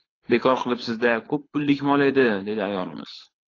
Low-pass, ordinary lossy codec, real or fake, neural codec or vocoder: 7.2 kHz; AAC, 32 kbps; fake; codec, 24 kHz, 6 kbps, HILCodec